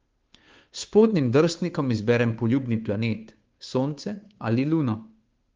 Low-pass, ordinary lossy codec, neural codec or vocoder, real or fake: 7.2 kHz; Opus, 32 kbps; codec, 16 kHz, 2 kbps, FunCodec, trained on Chinese and English, 25 frames a second; fake